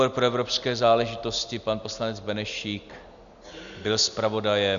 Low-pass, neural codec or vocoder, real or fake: 7.2 kHz; none; real